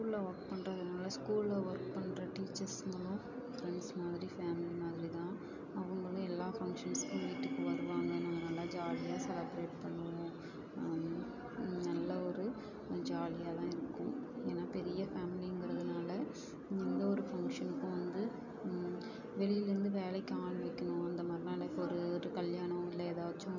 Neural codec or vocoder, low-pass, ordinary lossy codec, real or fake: none; 7.2 kHz; none; real